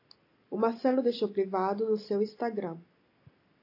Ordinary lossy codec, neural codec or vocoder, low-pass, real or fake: AAC, 32 kbps; none; 5.4 kHz; real